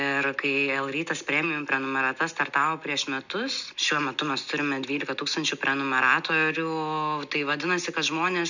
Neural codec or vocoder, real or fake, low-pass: none; real; 7.2 kHz